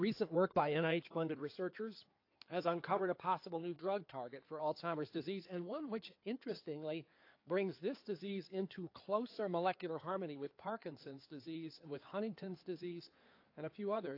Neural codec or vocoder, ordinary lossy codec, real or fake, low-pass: codec, 16 kHz in and 24 kHz out, 2.2 kbps, FireRedTTS-2 codec; AAC, 32 kbps; fake; 5.4 kHz